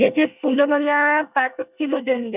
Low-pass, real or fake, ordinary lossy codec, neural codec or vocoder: 3.6 kHz; fake; none; codec, 24 kHz, 1 kbps, SNAC